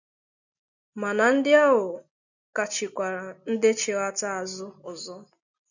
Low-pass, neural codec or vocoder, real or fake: 7.2 kHz; none; real